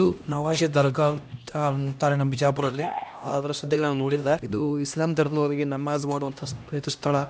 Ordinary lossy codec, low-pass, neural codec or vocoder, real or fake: none; none; codec, 16 kHz, 1 kbps, X-Codec, HuBERT features, trained on LibriSpeech; fake